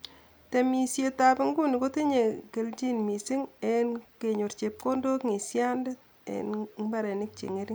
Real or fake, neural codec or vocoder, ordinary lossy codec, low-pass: real; none; none; none